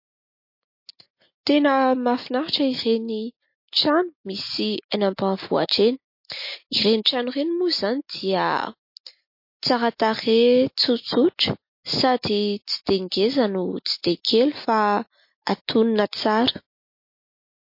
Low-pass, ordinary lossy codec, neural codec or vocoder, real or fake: 5.4 kHz; MP3, 32 kbps; none; real